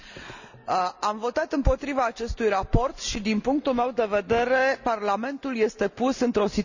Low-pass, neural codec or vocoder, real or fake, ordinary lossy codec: 7.2 kHz; none; real; none